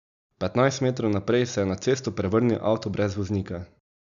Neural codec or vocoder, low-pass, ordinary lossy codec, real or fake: none; 7.2 kHz; none; real